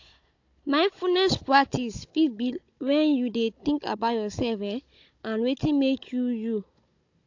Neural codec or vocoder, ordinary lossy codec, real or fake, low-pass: codec, 16 kHz, 16 kbps, FunCodec, trained on Chinese and English, 50 frames a second; AAC, 48 kbps; fake; 7.2 kHz